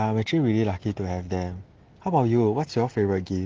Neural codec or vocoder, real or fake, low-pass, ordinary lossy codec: none; real; 7.2 kHz; Opus, 16 kbps